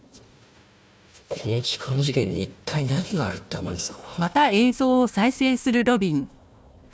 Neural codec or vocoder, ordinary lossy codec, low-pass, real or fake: codec, 16 kHz, 1 kbps, FunCodec, trained on Chinese and English, 50 frames a second; none; none; fake